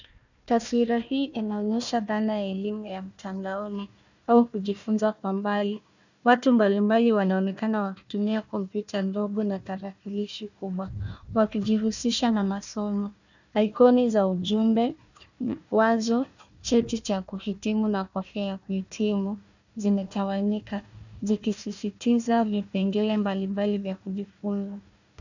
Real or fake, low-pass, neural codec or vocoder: fake; 7.2 kHz; codec, 16 kHz, 1 kbps, FunCodec, trained on Chinese and English, 50 frames a second